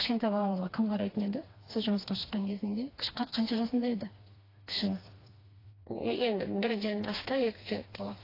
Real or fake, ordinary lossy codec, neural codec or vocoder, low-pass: fake; AAC, 24 kbps; codec, 16 kHz, 2 kbps, FreqCodec, smaller model; 5.4 kHz